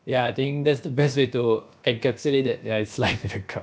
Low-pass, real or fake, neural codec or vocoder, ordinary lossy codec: none; fake; codec, 16 kHz, about 1 kbps, DyCAST, with the encoder's durations; none